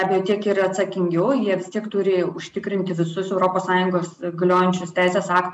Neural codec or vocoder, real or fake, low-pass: none; real; 10.8 kHz